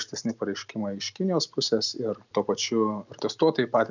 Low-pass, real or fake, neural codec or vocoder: 7.2 kHz; real; none